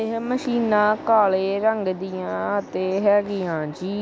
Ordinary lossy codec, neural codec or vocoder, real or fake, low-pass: none; none; real; none